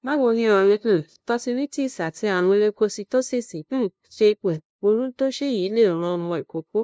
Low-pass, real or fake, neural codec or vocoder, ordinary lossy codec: none; fake; codec, 16 kHz, 0.5 kbps, FunCodec, trained on LibriTTS, 25 frames a second; none